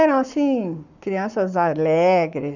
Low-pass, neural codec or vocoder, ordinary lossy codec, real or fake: 7.2 kHz; codec, 44.1 kHz, 7.8 kbps, Pupu-Codec; none; fake